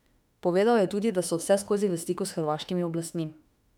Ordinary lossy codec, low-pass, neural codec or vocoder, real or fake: none; 19.8 kHz; autoencoder, 48 kHz, 32 numbers a frame, DAC-VAE, trained on Japanese speech; fake